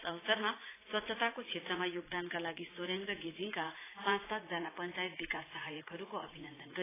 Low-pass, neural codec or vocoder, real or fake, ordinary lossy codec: 3.6 kHz; vocoder, 44.1 kHz, 80 mel bands, Vocos; fake; AAC, 16 kbps